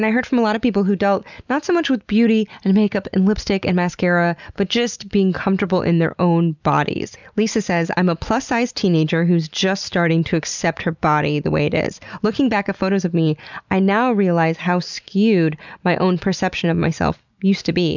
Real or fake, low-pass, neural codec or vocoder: real; 7.2 kHz; none